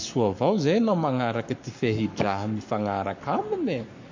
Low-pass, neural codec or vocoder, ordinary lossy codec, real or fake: 7.2 kHz; codec, 44.1 kHz, 7.8 kbps, Pupu-Codec; MP3, 48 kbps; fake